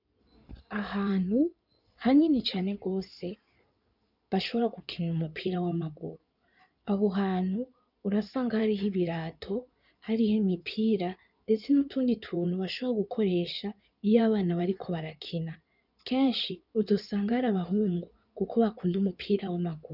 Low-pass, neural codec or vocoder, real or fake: 5.4 kHz; codec, 16 kHz in and 24 kHz out, 2.2 kbps, FireRedTTS-2 codec; fake